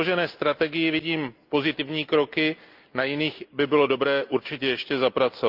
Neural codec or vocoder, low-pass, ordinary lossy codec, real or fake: none; 5.4 kHz; Opus, 24 kbps; real